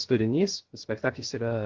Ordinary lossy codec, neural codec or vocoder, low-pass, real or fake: Opus, 16 kbps; codec, 16 kHz, 0.3 kbps, FocalCodec; 7.2 kHz; fake